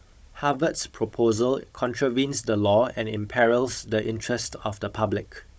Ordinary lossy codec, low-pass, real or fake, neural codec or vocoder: none; none; fake; codec, 16 kHz, 16 kbps, FunCodec, trained on Chinese and English, 50 frames a second